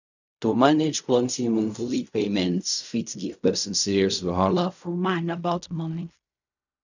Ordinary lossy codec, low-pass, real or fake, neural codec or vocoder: none; 7.2 kHz; fake; codec, 16 kHz in and 24 kHz out, 0.4 kbps, LongCat-Audio-Codec, fine tuned four codebook decoder